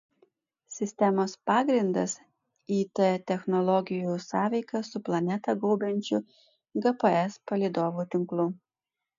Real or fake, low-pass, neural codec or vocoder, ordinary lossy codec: real; 7.2 kHz; none; MP3, 64 kbps